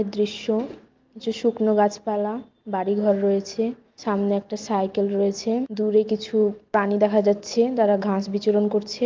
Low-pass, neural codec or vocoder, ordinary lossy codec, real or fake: 7.2 kHz; none; Opus, 32 kbps; real